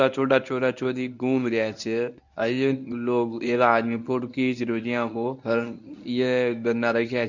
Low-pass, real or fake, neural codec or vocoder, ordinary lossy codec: 7.2 kHz; fake; codec, 24 kHz, 0.9 kbps, WavTokenizer, medium speech release version 1; none